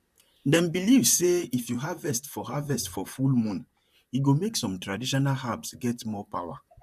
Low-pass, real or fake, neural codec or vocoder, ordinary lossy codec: 14.4 kHz; fake; vocoder, 44.1 kHz, 128 mel bands, Pupu-Vocoder; none